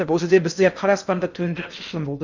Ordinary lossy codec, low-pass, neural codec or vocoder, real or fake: none; 7.2 kHz; codec, 16 kHz in and 24 kHz out, 0.6 kbps, FocalCodec, streaming, 4096 codes; fake